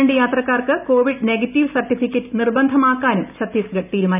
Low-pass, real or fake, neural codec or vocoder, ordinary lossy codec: 3.6 kHz; real; none; none